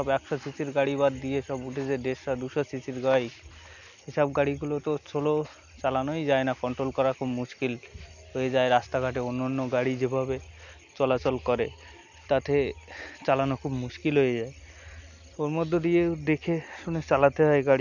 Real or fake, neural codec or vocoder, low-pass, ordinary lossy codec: real; none; 7.2 kHz; none